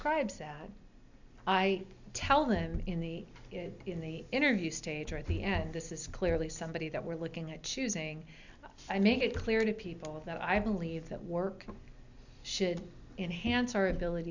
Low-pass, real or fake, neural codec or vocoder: 7.2 kHz; real; none